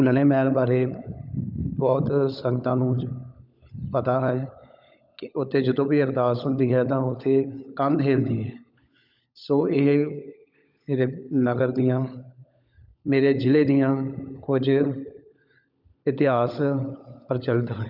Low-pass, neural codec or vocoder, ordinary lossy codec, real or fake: 5.4 kHz; codec, 16 kHz, 16 kbps, FunCodec, trained on LibriTTS, 50 frames a second; none; fake